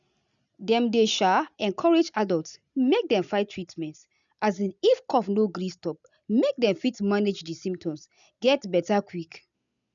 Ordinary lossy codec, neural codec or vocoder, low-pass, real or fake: none; none; 7.2 kHz; real